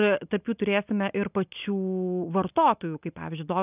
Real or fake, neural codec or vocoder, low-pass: real; none; 3.6 kHz